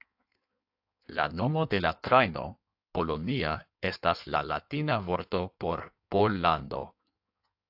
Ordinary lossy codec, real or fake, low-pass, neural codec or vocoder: AAC, 48 kbps; fake; 5.4 kHz; codec, 16 kHz in and 24 kHz out, 1.1 kbps, FireRedTTS-2 codec